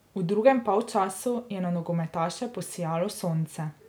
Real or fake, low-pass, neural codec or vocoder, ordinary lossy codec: real; none; none; none